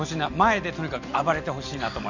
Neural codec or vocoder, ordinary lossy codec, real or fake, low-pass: none; none; real; 7.2 kHz